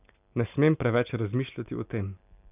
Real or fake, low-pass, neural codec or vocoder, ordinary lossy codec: real; 3.6 kHz; none; none